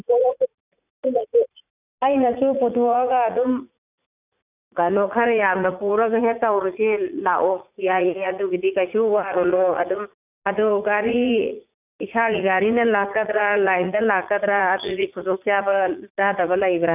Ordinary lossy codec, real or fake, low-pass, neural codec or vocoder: none; fake; 3.6 kHz; vocoder, 44.1 kHz, 80 mel bands, Vocos